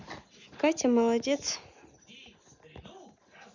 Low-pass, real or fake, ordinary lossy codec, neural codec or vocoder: 7.2 kHz; real; none; none